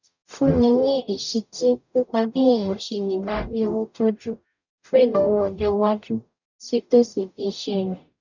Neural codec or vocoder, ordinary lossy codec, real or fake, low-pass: codec, 44.1 kHz, 0.9 kbps, DAC; none; fake; 7.2 kHz